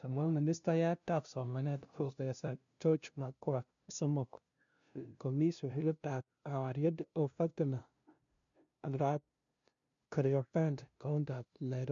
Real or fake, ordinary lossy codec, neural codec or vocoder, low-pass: fake; MP3, 64 kbps; codec, 16 kHz, 0.5 kbps, FunCodec, trained on LibriTTS, 25 frames a second; 7.2 kHz